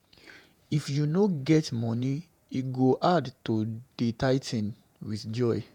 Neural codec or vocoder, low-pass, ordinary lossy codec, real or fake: vocoder, 48 kHz, 128 mel bands, Vocos; 19.8 kHz; none; fake